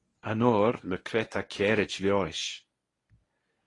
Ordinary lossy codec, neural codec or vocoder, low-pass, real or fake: AAC, 32 kbps; codec, 24 kHz, 0.9 kbps, WavTokenizer, medium speech release version 1; 10.8 kHz; fake